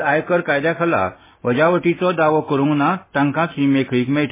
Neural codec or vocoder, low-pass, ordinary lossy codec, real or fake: none; 3.6 kHz; MP3, 16 kbps; real